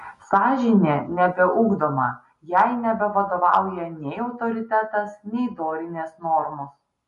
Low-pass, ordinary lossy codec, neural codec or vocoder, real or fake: 14.4 kHz; MP3, 48 kbps; none; real